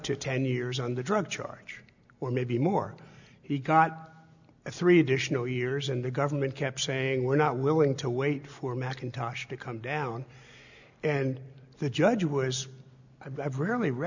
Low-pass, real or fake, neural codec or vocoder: 7.2 kHz; real; none